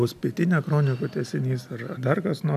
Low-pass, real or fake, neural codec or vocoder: 14.4 kHz; real; none